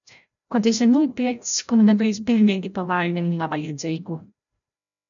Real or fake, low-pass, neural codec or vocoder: fake; 7.2 kHz; codec, 16 kHz, 0.5 kbps, FreqCodec, larger model